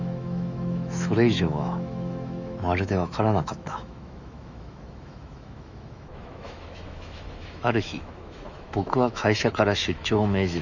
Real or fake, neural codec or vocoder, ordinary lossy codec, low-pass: fake; autoencoder, 48 kHz, 128 numbers a frame, DAC-VAE, trained on Japanese speech; none; 7.2 kHz